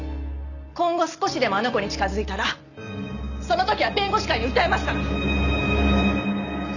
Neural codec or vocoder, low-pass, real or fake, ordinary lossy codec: none; 7.2 kHz; real; none